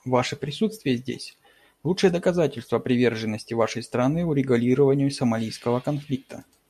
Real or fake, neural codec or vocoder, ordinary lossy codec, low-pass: real; none; MP3, 64 kbps; 14.4 kHz